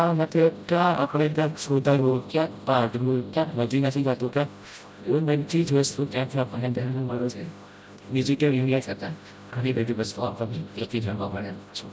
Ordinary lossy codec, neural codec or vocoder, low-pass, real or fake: none; codec, 16 kHz, 0.5 kbps, FreqCodec, smaller model; none; fake